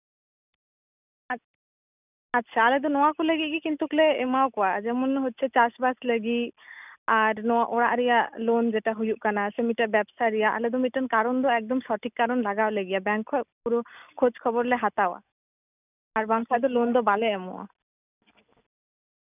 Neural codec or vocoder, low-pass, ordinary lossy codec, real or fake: none; 3.6 kHz; none; real